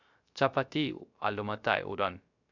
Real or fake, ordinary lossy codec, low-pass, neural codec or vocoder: fake; none; 7.2 kHz; codec, 16 kHz, 0.3 kbps, FocalCodec